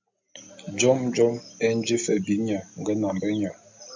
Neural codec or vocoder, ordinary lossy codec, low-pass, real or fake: none; MP3, 64 kbps; 7.2 kHz; real